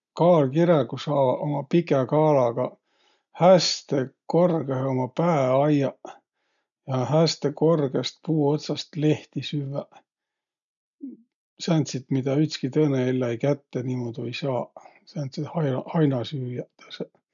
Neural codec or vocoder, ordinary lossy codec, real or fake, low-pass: none; none; real; 7.2 kHz